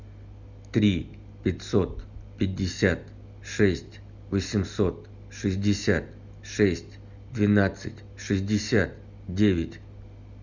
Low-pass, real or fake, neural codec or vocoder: 7.2 kHz; real; none